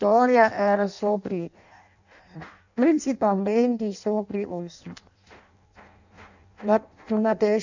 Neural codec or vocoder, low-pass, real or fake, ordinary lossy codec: codec, 16 kHz in and 24 kHz out, 0.6 kbps, FireRedTTS-2 codec; 7.2 kHz; fake; none